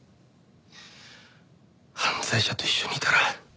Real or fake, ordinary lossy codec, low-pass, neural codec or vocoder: real; none; none; none